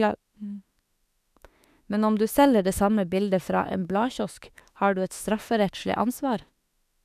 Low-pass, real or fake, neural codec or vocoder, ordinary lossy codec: 14.4 kHz; fake; autoencoder, 48 kHz, 32 numbers a frame, DAC-VAE, trained on Japanese speech; none